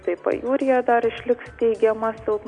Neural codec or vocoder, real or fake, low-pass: none; real; 9.9 kHz